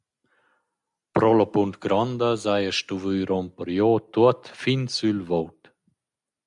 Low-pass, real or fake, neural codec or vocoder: 10.8 kHz; real; none